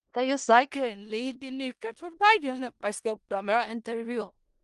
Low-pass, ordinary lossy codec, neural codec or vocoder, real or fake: 10.8 kHz; Opus, 24 kbps; codec, 16 kHz in and 24 kHz out, 0.4 kbps, LongCat-Audio-Codec, four codebook decoder; fake